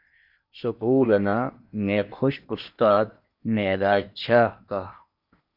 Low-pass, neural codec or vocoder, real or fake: 5.4 kHz; codec, 16 kHz in and 24 kHz out, 0.8 kbps, FocalCodec, streaming, 65536 codes; fake